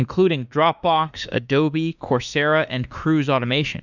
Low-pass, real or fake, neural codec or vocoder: 7.2 kHz; fake; autoencoder, 48 kHz, 32 numbers a frame, DAC-VAE, trained on Japanese speech